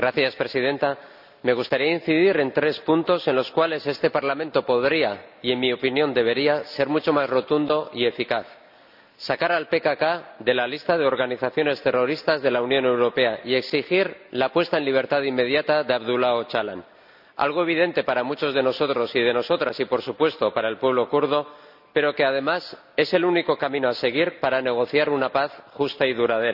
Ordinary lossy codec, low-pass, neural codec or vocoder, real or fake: none; 5.4 kHz; none; real